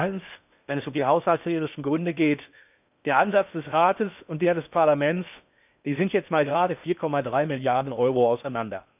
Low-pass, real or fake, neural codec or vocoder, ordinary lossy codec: 3.6 kHz; fake; codec, 16 kHz in and 24 kHz out, 0.6 kbps, FocalCodec, streaming, 4096 codes; none